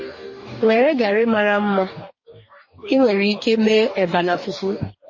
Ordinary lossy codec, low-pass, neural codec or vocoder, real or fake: MP3, 32 kbps; 7.2 kHz; codec, 44.1 kHz, 2.6 kbps, DAC; fake